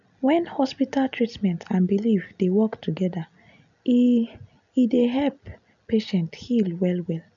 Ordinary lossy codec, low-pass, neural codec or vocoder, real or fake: none; 7.2 kHz; none; real